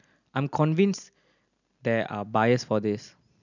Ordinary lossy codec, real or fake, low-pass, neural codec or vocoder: none; real; 7.2 kHz; none